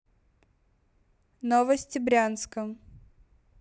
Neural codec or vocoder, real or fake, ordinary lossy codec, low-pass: none; real; none; none